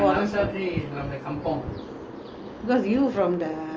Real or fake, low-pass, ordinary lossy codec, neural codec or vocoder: real; 7.2 kHz; Opus, 24 kbps; none